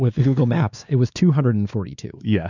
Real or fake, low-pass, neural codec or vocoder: fake; 7.2 kHz; codec, 16 kHz, 2 kbps, X-Codec, WavLM features, trained on Multilingual LibriSpeech